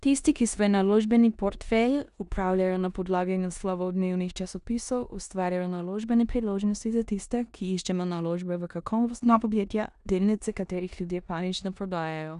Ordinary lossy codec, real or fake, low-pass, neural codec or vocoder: none; fake; 10.8 kHz; codec, 16 kHz in and 24 kHz out, 0.9 kbps, LongCat-Audio-Codec, four codebook decoder